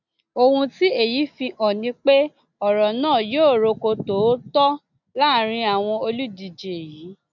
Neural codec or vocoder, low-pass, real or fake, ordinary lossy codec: none; 7.2 kHz; real; none